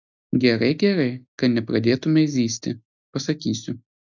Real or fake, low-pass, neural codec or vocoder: real; 7.2 kHz; none